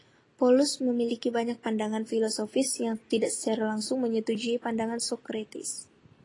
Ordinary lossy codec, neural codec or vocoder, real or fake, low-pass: AAC, 32 kbps; none; real; 10.8 kHz